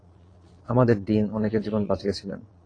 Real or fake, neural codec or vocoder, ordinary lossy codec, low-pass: fake; vocoder, 22.05 kHz, 80 mel bands, Vocos; AAC, 32 kbps; 9.9 kHz